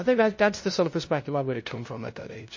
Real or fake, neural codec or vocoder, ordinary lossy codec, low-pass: fake; codec, 16 kHz, 0.5 kbps, FunCodec, trained on LibriTTS, 25 frames a second; MP3, 32 kbps; 7.2 kHz